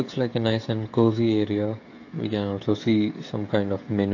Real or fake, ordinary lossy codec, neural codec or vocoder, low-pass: fake; AAC, 48 kbps; codec, 16 kHz, 16 kbps, FreqCodec, smaller model; 7.2 kHz